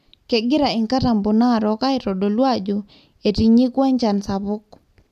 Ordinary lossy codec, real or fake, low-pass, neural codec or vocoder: none; real; 14.4 kHz; none